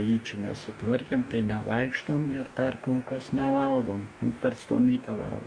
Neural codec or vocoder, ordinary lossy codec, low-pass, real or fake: codec, 44.1 kHz, 2.6 kbps, DAC; Opus, 64 kbps; 9.9 kHz; fake